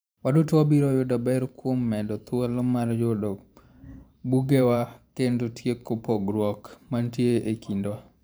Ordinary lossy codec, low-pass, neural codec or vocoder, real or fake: none; none; vocoder, 44.1 kHz, 128 mel bands every 512 samples, BigVGAN v2; fake